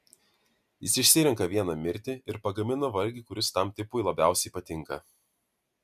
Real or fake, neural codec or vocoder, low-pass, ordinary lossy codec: real; none; 14.4 kHz; MP3, 96 kbps